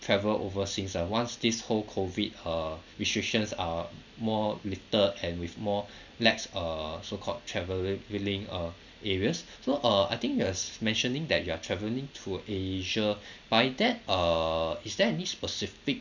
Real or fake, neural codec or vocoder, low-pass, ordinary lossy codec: real; none; 7.2 kHz; none